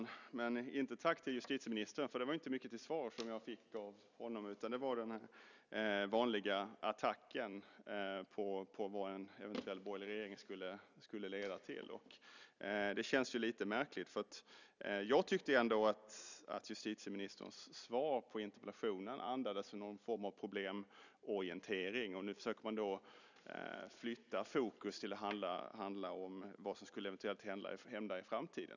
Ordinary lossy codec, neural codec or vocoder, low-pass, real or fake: none; none; 7.2 kHz; real